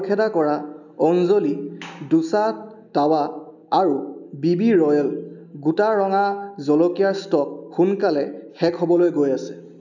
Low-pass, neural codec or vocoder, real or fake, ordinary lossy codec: 7.2 kHz; none; real; none